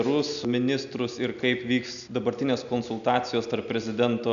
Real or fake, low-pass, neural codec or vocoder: real; 7.2 kHz; none